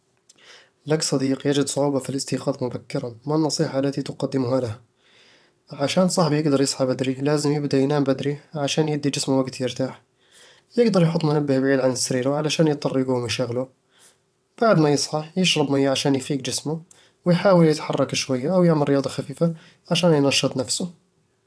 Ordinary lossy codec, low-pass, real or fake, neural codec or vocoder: none; none; fake; vocoder, 22.05 kHz, 80 mel bands, WaveNeXt